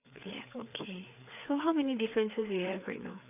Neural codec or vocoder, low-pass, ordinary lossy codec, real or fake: codec, 16 kHz, 4 kbps, FreqCodec, larger model; 3.6 kHz; none; fake